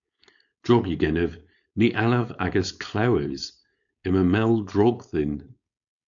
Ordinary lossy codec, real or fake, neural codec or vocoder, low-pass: MP3, 96 kbps; fake; codec, 16 kHz, 4.8 kbps, FACodec; 7.2 kHz